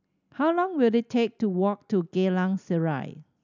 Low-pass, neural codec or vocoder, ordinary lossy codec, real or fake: 7.2 kHz; none; none; real